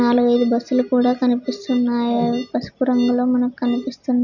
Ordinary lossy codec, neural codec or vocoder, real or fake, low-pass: none; none; real; 7.2 kHz